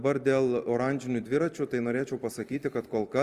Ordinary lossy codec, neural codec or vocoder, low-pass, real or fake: Opus, 32 kbps; vocoder, 44.1 kHz, 128 mel bands every 256 samples, BigVGAN v2; 14.4 kHz; fake